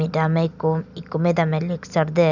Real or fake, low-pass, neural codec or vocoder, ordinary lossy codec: real; 7.2 kHz; none; none